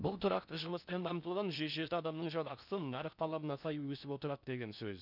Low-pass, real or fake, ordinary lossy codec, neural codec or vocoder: 5.4 kHz; fake; none; codec, 16 kHz in and 24 kHz out, 0.6 kbps, FocalCodec, streaming, 4096 codes